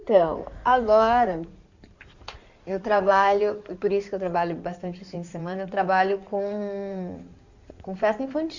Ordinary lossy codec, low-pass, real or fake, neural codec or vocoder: Opus, 64 kbps; 7.2 kHz; fake; codec, 16 kHz in and 24 kHz out, 2.2 kbps, FireRedTTS-2 codec